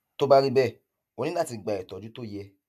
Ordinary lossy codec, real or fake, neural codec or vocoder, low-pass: AAC, 96 kbps; real; none; 14.4 kHz